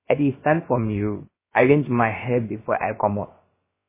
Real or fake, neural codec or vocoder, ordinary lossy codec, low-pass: fake; codec, 16 kHz, about 1 kbps, DyCAST, with the encoder's durations; MP3, 16 kbps; 3.6 kHz